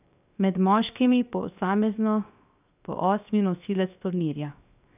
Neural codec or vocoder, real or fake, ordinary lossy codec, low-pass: codec, 16 kHz, 0.7 kbps, FocalCodec; fake; none; 3.6 kHz